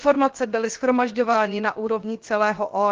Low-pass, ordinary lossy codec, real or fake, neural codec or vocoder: 7.2 kHz; Opus, 16 kbps; fake; codec, 16 kHz, 0.7 kbps, FocalCodec